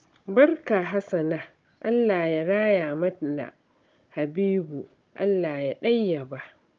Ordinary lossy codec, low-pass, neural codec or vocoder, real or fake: Opus, 24 kbps; 7.2 kHz; codec, 16 kHz, 16 kbps, FunCodec, trained on Chinese and English, 50 frames a second; fake